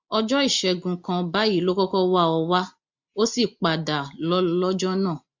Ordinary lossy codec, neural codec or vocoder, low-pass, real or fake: MP3, 48 kbps; none; 7.2 kHz; real